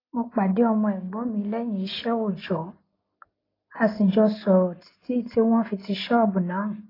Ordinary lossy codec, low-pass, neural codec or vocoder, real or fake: AAC, 24 kbps; 5.4 kHz; none; real